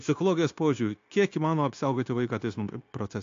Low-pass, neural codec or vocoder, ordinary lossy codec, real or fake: 7.2 kHz; codec, 16 kHz, 0.9 kbps, LongCat-Audio-Codec; AAC, 48 kbps; fake